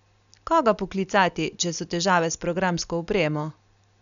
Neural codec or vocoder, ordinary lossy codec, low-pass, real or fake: none; none; 7.2 kHz; real